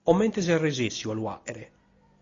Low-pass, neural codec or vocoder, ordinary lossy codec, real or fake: 7.2 kHz; none; AAC, 32 kbps; real